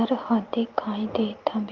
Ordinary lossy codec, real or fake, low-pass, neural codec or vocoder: Opus, 32 kbps; real; 7.2 kHz; none